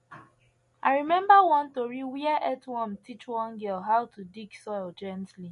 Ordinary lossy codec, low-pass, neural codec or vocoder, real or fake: MP3, 48 kbps; 14.4 kHz; none; real